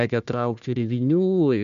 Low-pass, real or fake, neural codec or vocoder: 7.2 kHz; fake; codec, 16 kHz, 1 kbps, FunCodec, trained on Chinese and English, 50 frames a second